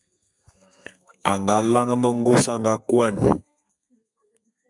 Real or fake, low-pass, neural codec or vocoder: fake; 10.8 kHz; codec, 32 kHz, 1.9 kbps, SNAC